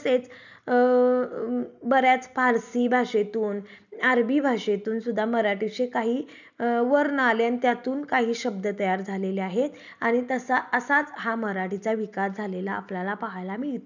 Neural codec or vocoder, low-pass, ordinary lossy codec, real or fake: none; 7.2 kHz; none; real